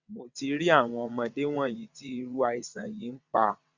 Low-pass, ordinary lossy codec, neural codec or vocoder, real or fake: 7.2 kHz; Opus, 64 kbps; vocoder, 22.05 kHz, 80 mel bands, WaveNeXt; fake